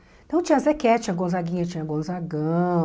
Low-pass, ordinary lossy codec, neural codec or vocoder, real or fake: none; none; none; real